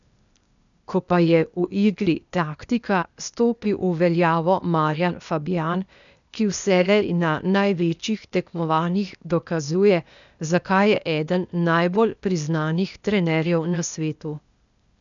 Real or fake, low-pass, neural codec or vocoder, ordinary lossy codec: fake; 7.2 kHz; codec, 16 kHz, 0.8 kbps, ZipCodec; none